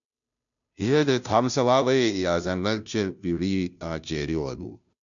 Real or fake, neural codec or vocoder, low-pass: fake; codec, 16 kHz, 0.5 kbps, FunCodec, trained on Chinese and English, 25 frames a second; 7.2 kHz